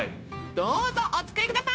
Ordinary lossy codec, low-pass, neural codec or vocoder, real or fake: none; none; codec, 16 kHz, 0.9 kbps, LongCat-Audio-Codec; fake